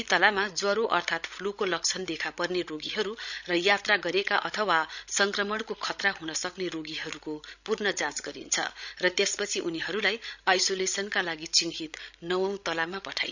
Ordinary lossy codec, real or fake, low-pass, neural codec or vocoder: none; fake; 7.2 kHz; codec, 16 kHz, 16 kbps, FreqCodec, larger model